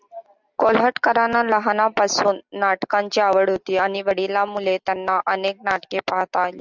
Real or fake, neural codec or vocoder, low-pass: real; none; 7.2 kHz